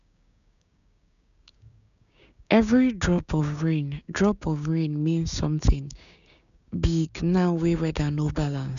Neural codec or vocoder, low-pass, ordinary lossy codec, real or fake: codec, 16 kHz, 6 kbps, DAC; 7.2 kHz; none; fake